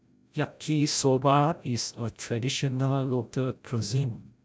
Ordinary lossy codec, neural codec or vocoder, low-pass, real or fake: none; codec, 16 kHz, 0.5 kbps, FreqCodec, larger model; none; fake